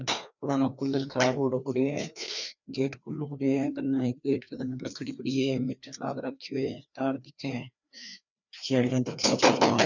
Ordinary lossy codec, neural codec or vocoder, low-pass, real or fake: none; codec, 16 kHz, 2 kbps, FreqCodec, larger model; 7.2 kHz; fake